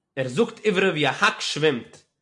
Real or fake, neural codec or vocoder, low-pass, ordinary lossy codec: real; none; 10.8 kHz; MP3, 64 kbps